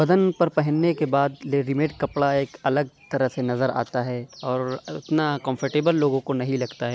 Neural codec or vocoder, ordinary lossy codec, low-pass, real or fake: none; none; none; real